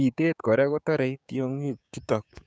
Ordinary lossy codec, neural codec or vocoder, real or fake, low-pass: none; codec, 16 kHz, 6 kbps, DAC; fake; none